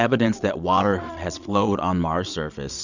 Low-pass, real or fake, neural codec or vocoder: 7.2 kHz; fake; vocoder, 22.05 kHz, 80 mel bands, Vocos